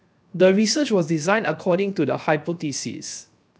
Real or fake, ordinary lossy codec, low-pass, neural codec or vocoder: fake; none; none; codec, 16 kHz, 0.7 kbps, FocalCodec